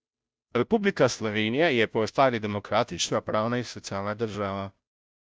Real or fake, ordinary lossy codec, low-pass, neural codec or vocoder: fake; none; none; codec, 16 kHz, 0.5 kbps, FunCodec, trained on Chinese and English, 25 frames a second